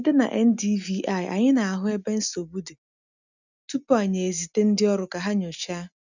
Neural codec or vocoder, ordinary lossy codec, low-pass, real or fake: none; none; 7.2 kHz; real